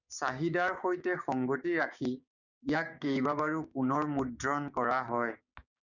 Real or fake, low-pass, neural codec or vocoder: fake; 7.2 kHz; codec, 16 kHz, 6 kbps, DAC